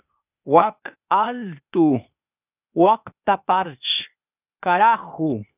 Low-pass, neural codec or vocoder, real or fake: 3.6 kHz; codec, 16 kHz, 0.8 kbps, ZipCodec; fake